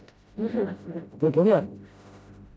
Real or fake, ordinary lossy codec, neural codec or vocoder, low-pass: fake; none; codec, 16 kHz, 0.5 kbps, FreqCodec, smaller model; none